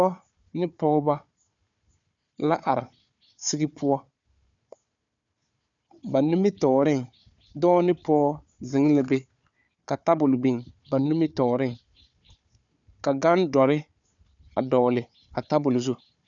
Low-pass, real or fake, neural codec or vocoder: 7.2 kHz; fake; codec, 16 kHz, 4 kbps, FunCodec, trained on Chinese and English, 50 frames a second